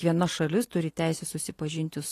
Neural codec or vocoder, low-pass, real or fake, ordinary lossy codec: none; 14.4 kHz; real; AAC, 48 kbps